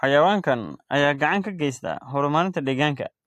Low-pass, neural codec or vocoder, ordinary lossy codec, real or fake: 14.4 kHz; none; AAC, 64 kbps; real